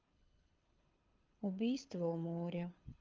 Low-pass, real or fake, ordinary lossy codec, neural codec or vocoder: 7.2 kHz; fake; Opus, 24 kbps; codec, 24 kHz, 6 kbps, HILCodec